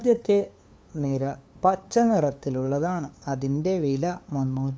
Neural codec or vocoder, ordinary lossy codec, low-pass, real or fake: codec, 16 kHz, 2 kbps, FunCodec, trained on LibriTTS, 25 frames a second; none; none; fake